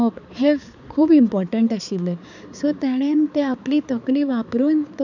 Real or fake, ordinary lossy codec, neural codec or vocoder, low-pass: fake; none; codec, 16 kHz, 4 kbps, X-Codec, HuBERT features, trained on balanced general audio; 7.2 kHz